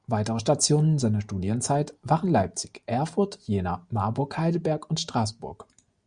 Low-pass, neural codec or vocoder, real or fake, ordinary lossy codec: 9.9 kHz; none; real; Opus, 64 kbps